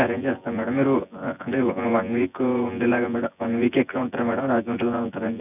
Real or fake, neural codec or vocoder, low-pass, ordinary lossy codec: fake; vocoder, 24 kHz, 100 mel bands, Vocos; 3.6 kHz; none